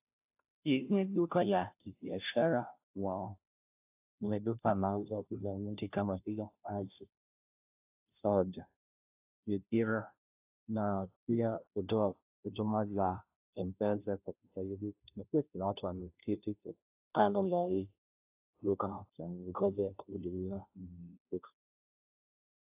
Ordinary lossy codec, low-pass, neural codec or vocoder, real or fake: AAC, 32 kbps; 3.6 kHz; codec, 16 kHz, 0.5 kbps, FunCodec, trained on Chinese and English, 25 frames a second; fake